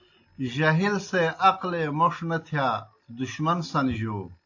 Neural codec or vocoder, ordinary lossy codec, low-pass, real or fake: none; AAC, 48 kbps; 7.2 kHz; real